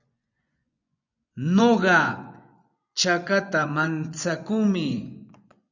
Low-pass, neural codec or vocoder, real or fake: 7.2 kHz; vocoder, 24 kHz, 100 mel bands, Vocos; fake